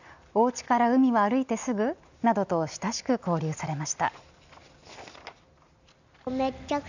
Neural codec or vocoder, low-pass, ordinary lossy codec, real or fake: none; 7.2 kHz; none; real